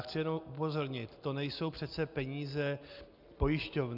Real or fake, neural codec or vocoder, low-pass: real; none; 5.4 kHz